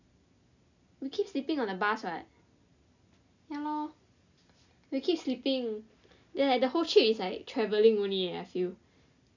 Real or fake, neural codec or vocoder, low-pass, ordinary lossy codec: real; none; 7.2 kHz; none